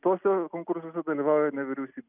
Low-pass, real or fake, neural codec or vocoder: 3.6 kHz; real; none